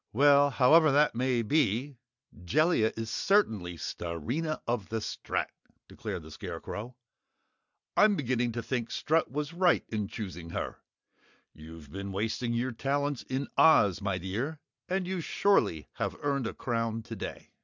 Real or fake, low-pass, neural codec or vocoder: real; 7.2 kHz; none